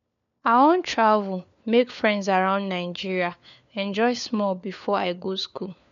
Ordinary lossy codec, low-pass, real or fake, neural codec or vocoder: none; 7.2 kHz; fake; codec, 16 kHz, 4 kbps, FunCodec, trained on LibriTTS, 50 frames a second